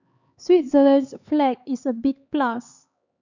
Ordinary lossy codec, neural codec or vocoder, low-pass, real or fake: none; codec, 16 kHz, 4 kbps, X-Codec, HuBERT features, trained on LibriSpeech; 7.2 kHz; fake